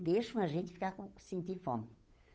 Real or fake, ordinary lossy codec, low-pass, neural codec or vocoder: fake; none; none; codec, 16 kHz, 8 kbps, FunCodec, trained on Chinese and English, 25 frames a second